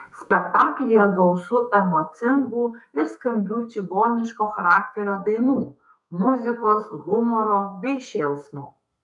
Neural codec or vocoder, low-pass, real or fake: codec, 32 kHz, 1.9 kbps, SNAC; 10.8 kHz; fake